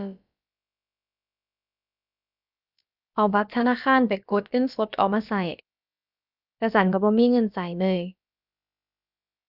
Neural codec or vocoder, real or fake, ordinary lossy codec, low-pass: codec, 16 kHz, about 1 kbps, DyCAST, with the encoder's durations; fake; none; 5.4 kHz